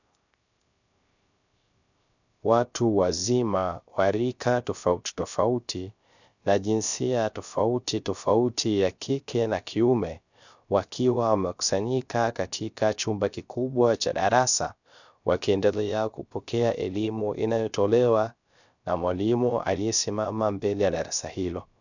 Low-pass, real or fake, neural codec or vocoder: 7.2 kHz; fake; codec, 16 kHz, 0.3 kbps, FocalCodec